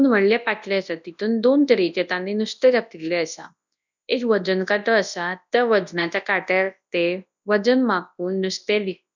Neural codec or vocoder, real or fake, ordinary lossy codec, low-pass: codec, 24 kHz, 0.9 kbps, WavTokenizer, large speech release; fake; none; 7.2 kHz